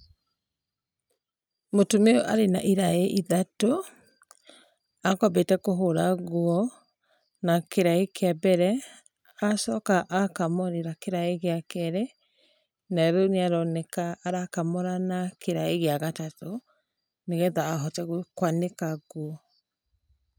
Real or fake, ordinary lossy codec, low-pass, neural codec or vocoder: real; none; 19.8 kHz; none